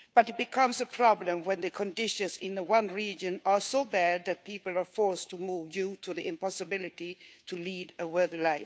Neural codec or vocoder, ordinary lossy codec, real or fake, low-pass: codec, 16 kHz, 2 kbps, FunCodec, trained on Chinese and English, 25 frames a second; none; fake; none